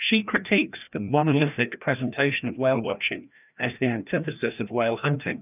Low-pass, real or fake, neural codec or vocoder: 3.6 kHz; fake; codec, 16 kHz, 1 kbps, FreqCodec, larger model